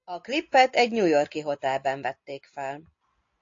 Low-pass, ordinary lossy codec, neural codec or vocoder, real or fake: 7.2 kHz; AAC, 48 kbps; none; real